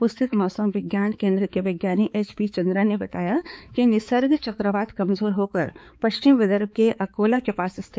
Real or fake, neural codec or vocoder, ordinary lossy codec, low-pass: fake; codec, 16 kHz, 4 kbps, X-Codec, HuBERT features, trained on balanced general audio; none; none